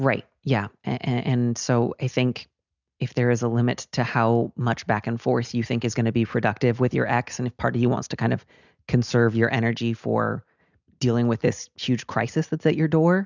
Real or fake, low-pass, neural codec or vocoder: real; 7.2 kHz; none